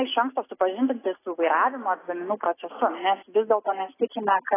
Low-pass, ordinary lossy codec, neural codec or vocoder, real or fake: 3.6 kHz; AAC, 16 kbps; none; real